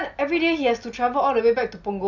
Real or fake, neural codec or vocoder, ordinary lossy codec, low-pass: real; none; none; 7.2 kHz